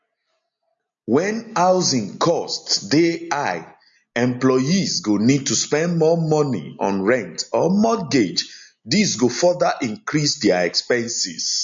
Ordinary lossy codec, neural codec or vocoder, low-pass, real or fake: MP3, 48 kbps; none; 7.2 kHz; real